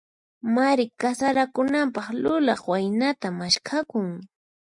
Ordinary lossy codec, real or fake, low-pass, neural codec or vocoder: MP3, 64 kbps; real; 10.8 kHz; none